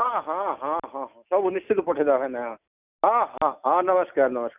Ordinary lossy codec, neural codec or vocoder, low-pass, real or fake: none; none; 3.6 kHz; real